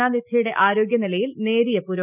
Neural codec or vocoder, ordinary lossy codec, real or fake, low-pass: none; none; real; 3.6 kHz